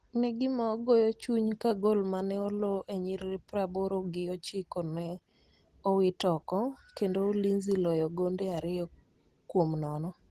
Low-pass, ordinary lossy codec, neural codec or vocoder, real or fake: 14.4 kHz; Opus, 16 kbps; none; real